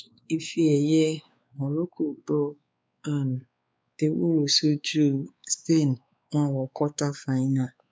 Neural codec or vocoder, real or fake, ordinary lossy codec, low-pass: codec, 16 kHz, 4 kbps, X-Codec, WavLM features, trained on Multilingual LibriSpeech; fake; none; none